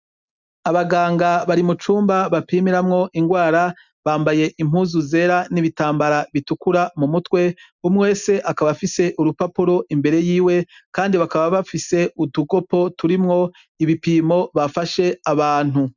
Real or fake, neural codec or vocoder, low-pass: real; none; 7.2 kHz